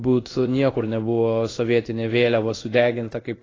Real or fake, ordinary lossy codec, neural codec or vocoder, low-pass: fake; AAC, 32 kbps; codec, 16 kHz in and 24 kHz out, 1 kbps, XY-Tokenizer; 7.2 kHz